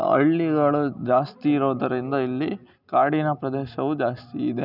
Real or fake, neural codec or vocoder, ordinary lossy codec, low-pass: real; none; none; 5.4 kHz